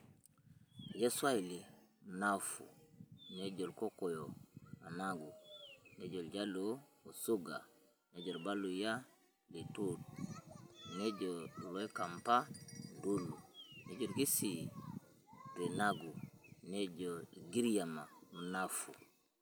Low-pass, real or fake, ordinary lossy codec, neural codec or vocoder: none; real; none; none